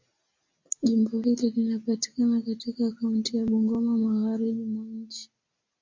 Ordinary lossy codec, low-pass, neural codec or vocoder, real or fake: MP3, 64 kbps; 7.2 kHz; none; real